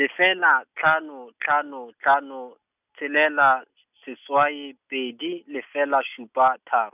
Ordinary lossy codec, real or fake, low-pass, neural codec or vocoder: none; real; 3.6 kHz; none